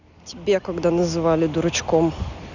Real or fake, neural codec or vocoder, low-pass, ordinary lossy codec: real; none; 7.2 kHz; none